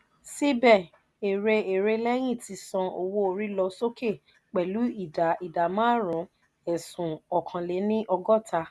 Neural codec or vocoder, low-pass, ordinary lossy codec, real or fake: none; none; none; real